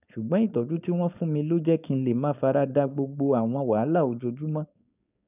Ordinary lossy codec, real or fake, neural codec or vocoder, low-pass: none; fake; codec, 16 kHz, 4.8 kbps, FACodec; 3.6 kHz